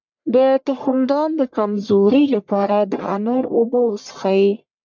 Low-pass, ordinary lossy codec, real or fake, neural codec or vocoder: 7.2 kHz; MP3, 64 kbps; fake; codec, 44.1 kHz, 1.7 kbps, Pupu-Codec